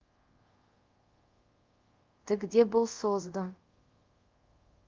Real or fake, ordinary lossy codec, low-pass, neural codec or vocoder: fake; Opus, 32 kbps; 7.2 kHz; codec, 24 kHz, 0.5 kbps, DualCodec